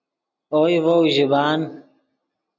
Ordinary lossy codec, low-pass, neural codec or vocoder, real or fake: MP3, 48 kbps; 7.2 kHz; none; real